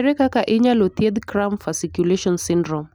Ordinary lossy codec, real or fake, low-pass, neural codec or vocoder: none; real; none; none